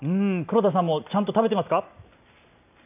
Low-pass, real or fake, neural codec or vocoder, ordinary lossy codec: 3.6 kHz; real; none; none